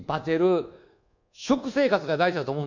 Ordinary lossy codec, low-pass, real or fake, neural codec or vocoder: none; 7.2 kHz; fake; codec, 24 kHz, 1.2 kbps, DualCodec